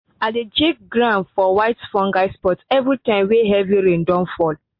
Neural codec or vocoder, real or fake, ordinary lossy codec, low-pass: none; real; MP3, 32 kbps; 5.4 kHz